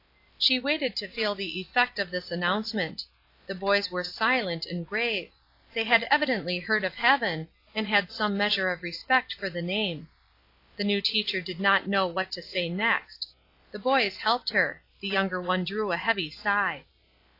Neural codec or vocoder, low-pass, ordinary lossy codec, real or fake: none; 5.4 kHz; AAC, 32 kbps; real